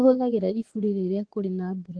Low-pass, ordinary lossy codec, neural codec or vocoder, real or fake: 9.9 kHz; Opus, 16 kbps; vocoder, 24 kHz, 100 mel bands, Vocos; fake